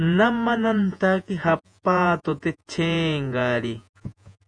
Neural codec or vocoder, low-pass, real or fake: vocoder, 48 kHz, 128 mel bands, Vocos; 9.9 kHz; fake